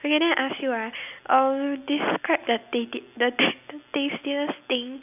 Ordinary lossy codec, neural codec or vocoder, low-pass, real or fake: none; none; 3.6 kHz; real